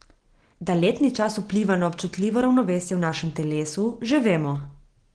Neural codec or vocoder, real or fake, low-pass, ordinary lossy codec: none; real; 9.9 kHz; Opus, 16 kbps